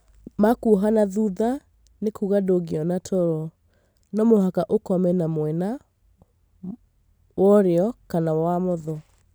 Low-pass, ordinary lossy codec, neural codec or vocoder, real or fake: none; none; none; real